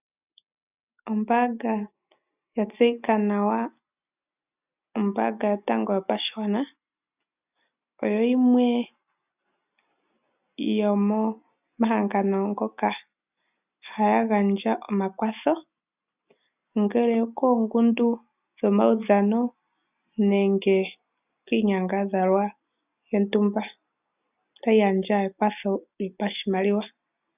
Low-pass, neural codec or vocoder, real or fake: 3.6 kHz; none; real